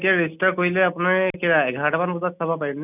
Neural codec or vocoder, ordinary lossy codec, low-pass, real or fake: none; none; 3.6 kHz; real